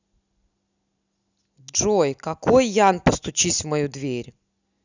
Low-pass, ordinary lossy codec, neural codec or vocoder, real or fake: 7.2 kHz; none; none; real